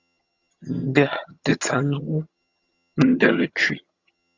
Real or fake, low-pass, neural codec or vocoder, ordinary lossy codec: fake; 7.2 kHz; vocoder, 22.05 kHz, 80 mel bands, HiFi-GAN; Opus, 64 kbps